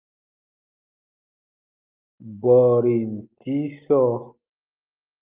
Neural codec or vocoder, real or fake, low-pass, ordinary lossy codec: none; real; 3.6 kHz; Opus, 24 kbps